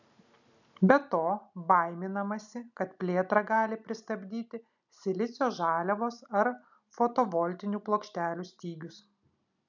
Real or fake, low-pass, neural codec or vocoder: real; 7.2 kHz; none